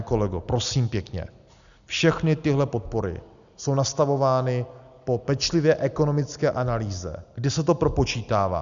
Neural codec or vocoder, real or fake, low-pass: none; real; 7.2 kHz